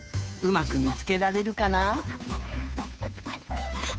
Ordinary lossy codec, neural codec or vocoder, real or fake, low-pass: none; codec, 16 kHz, 2 kbps, FunCodec, trained on Chinese and English, 25 frames a second; fake; none